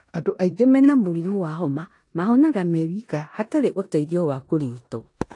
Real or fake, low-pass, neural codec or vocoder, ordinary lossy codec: fake; 10.8 kHz; codec, 16 kHz in and 24 kHz out, 0.9 kbps, LongCat-Audio-Codec, four codebook decoder; AAC, 48 kbps